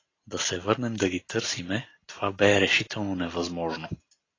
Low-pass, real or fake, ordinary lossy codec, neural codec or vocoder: 7.2 kHz; real; AAC, 32 kbps; none